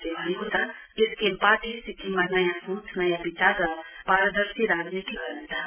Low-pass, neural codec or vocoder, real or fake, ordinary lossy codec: 3.6 kHz; none; real; none